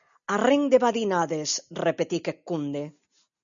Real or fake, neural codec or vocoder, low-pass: real; none; 7.2 kHz